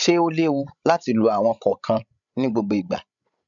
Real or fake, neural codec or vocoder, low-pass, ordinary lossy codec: fake; codec, 16 kHz, 16 kbps, FreqCodec, larger model; 7.2 kHz; none